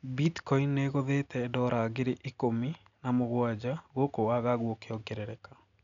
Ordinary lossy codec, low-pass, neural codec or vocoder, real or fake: none; 7.2 kHz; none; real